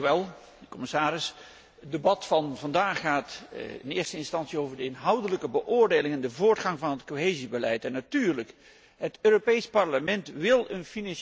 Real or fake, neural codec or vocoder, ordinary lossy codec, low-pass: real; none; none; none